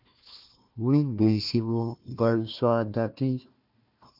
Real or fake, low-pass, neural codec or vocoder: fake; 5.4 kHz; codec, 16 kHz, 1 kbps, FunCodec, trained on Chinese and English, 50 frames a second